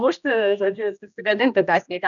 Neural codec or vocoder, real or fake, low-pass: codec, 16 kHz, 1 kbps, X-Codec, HuBERT features, trained on general audio; fake; 7.2 kHz